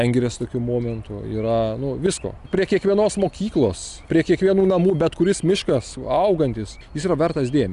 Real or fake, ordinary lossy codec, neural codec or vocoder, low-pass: real; Opus, 64 kbps; none; 10.8 kHz